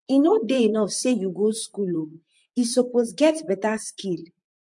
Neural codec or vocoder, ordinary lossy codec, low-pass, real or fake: vocoder, 44.1 kHz, 128 mel bands, Pupu-Vocoder; MP3, 64 kbps; 10.8 kHz; fake